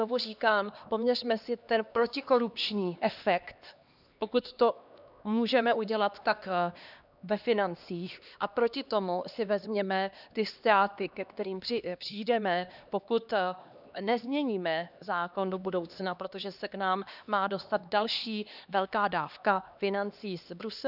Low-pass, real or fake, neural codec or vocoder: 5.4 kHz; fake; codec, 16 kHz, 2 kbps, X-Codec, HuBERT features, trained on LibriSpeech